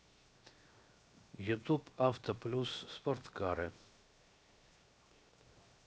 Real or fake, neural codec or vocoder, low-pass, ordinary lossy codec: fake; codec, 16 kHz, 0.7 kbps, FocalCodec; none; none